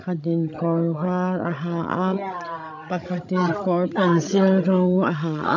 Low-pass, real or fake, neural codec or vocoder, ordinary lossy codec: 7.2 kHz; fake; codec, 16 kHz, 16 kbps, FreqCodec, larger model; none